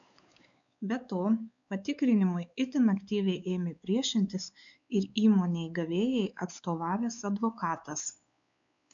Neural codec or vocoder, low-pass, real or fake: codec, 16 kHz, 4 kbps, X-Codec, WavLM features, trained on Multilingual LibriSpeech; 7.2 kHz; fake